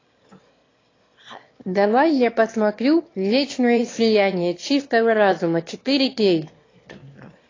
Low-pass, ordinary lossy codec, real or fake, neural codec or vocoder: 7.2 kHz; AAC, 32 kbps; fake; autoencoder, 22.05 kHz, a latent of 192 numbers a frame, VITS, trained on one speaker